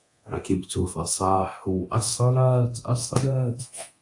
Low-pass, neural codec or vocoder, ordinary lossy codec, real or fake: 10.8 kHz; codec, 24 kHz, 0.9 kbps, DualCodec; AAC, 64 kbps; fake